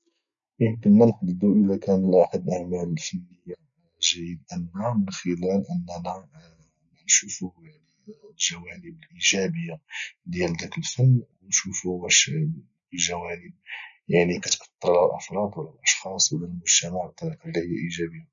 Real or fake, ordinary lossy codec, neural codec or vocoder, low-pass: real; none; none; 7.2 kHz